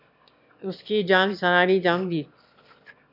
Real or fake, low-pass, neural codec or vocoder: fake; 5.4 kHz; autoencoder, 22.05 kHz, a latent of 192 numbers a frame, VITS, trained on one speaker